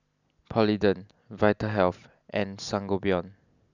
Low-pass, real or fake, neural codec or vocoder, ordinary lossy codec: 7.2 kHz; real; none; none